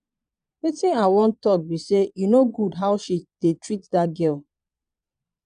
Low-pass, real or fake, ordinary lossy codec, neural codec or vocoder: 9.9 kHz; fake; MP3, 96 kbps; vocoder, 22.05 kHz, 80 mel bands, Vocos